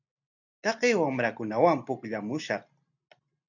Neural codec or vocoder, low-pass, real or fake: none; 7.2 kHz; real